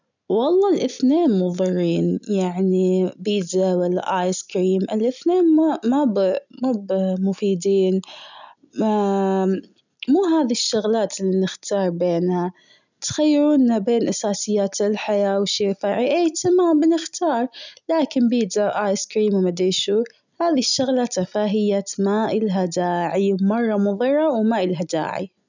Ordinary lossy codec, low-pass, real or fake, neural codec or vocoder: none; 7.2 kHz; real; none